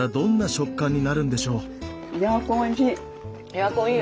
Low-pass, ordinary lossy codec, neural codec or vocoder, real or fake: none; none; none; real